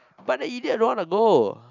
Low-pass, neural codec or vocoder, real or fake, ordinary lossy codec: 7.2 kHz; none; real; none